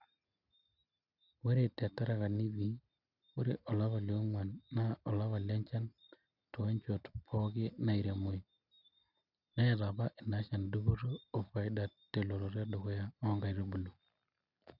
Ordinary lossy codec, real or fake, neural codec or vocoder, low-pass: none; real; none; 5.4 kHz